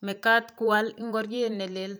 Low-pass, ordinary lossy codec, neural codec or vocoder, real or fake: none; none; vocoder, 44.1 kHz, 128 mel bands every 512 samples, BigVGAN v2; fake